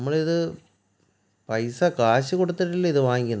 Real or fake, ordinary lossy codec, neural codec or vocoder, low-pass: real; none; none; none